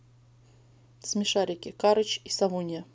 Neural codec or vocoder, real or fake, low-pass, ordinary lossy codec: none; real; none; none